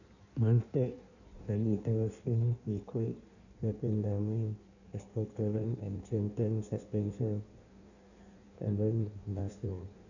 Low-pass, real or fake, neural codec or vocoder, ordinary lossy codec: 7.2 kHz; fake; codec, 16 kHz in and 24 kHz out, 1.1 kbps, FireRedTTS-2 codec; none